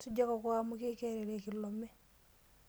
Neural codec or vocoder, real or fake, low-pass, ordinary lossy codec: none; real; none; none